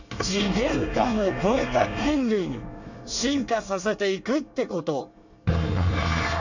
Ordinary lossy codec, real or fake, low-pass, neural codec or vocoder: none; fake; 7.2 kHz; codec, 24 kHz, 1 kbps, SNAC